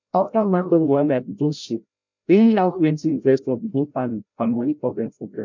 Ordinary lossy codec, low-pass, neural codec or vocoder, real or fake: MP3, 64 kbps; 7.2 kHz; codec, 16 kHz, 0.5 kbps, FreqCodec, larger model; fake